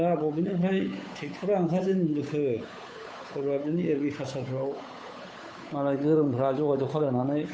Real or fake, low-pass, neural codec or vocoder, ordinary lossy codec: fake; none; codec, 16 kHz, 8 kbps, FunCodec, trained on Chinese and English, 25 frames a second; none